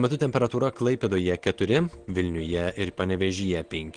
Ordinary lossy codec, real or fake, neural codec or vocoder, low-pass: Opus, 16 kbps; real; none; 9.9 kHz